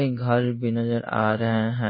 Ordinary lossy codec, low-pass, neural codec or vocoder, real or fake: MP3, 24 kbps; 5.4 kHz; codec, 16 kHz in and 24 kHz out, 1 kbps, XY-Tokenizer; fake